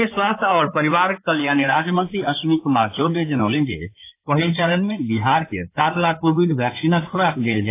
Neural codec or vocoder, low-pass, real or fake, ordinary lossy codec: codec, 16 kHz in and 24 kHz out, 2.2 kbps, FireRedTTS-2 codec; 3.6 kHz; fake; AAC, 24 kbps